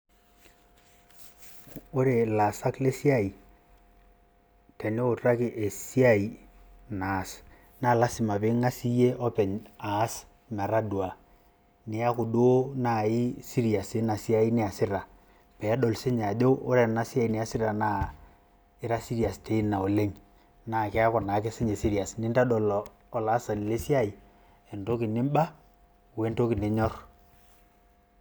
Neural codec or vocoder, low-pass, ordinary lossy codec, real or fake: none; none; none; real